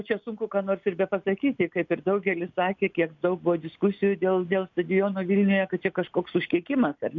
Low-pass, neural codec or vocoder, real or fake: 7.2 kHz; none; real